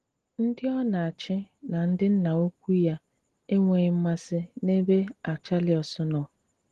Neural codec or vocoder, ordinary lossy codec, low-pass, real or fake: none; Opus, 16 kbps; 10.8 kHz; real